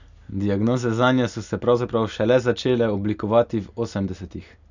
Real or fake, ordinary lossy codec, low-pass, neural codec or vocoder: real; none; 7.2 kHz; none